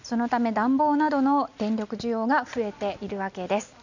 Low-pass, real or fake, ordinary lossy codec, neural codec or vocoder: 7.2 kHz; real; none; none